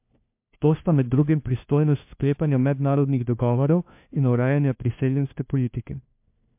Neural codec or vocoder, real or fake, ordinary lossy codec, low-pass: codec, 16 kHz, 1 kbps, FunCodec, trained on LibriTTS, 50 frames a second; fake; MP3, 32 kbps; 3.6 kHz